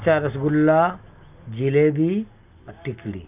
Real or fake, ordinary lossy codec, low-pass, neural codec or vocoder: real; none; 3.6 kHz; none